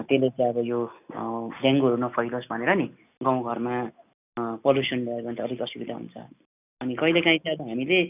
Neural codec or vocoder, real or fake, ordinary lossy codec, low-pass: none; real; none; 3.6 kHz